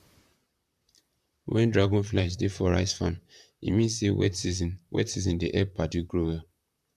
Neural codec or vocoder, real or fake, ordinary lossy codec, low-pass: vocoder, 44.1 kHz, 128 mel bands, Pupu-Vocoder; fake; none; 14.4 kHz